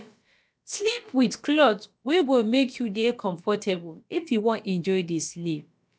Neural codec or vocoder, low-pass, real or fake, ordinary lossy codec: codec, 16 kHz, about 1 kbps, DyCAST, with the encoder's durations; none; fake; none